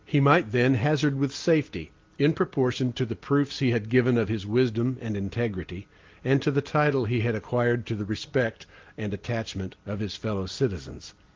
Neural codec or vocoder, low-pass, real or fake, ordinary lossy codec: none; 7.2 kHz; real; Opus, 32 kbps